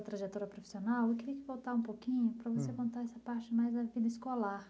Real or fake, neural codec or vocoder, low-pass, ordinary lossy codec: real; none; none; none